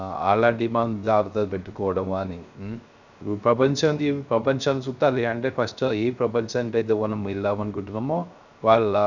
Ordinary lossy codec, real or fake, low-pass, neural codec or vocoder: none; fake; 7.2 kHz; codec, 16 kHz, 0.3 kbps, FocalCodec